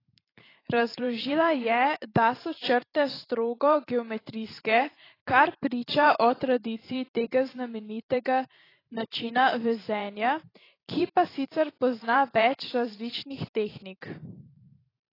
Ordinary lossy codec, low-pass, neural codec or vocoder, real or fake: AAC, 24 kbps; 5.4 kHz; vocoder, 44.1 kHz, 128 mel bands every 512 samples, BigVGAN v2; fake